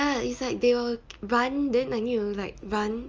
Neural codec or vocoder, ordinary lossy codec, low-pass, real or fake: none; Opus, 24 kbps; 7.2 kHz; real